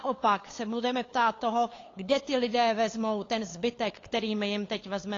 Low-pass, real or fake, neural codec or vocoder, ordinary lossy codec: 7.2 kHz; fake; codec, 16 kHz, 8 kbps, FunCodec, trained on LibriTTS, 25 frames a second; AAC, 32 kbps